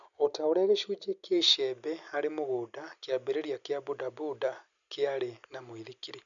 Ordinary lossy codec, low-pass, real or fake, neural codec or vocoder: none; 7.2 kHz; real; none